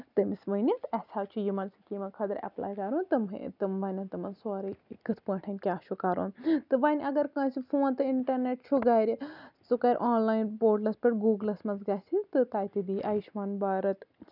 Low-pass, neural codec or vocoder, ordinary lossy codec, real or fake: 5.4 kHz; none; none; real